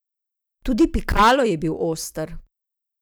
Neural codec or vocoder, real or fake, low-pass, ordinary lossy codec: vocoder, 44.1 kHz, 128 mel bands every 512 samples, BigVGAN v2; fake; none; none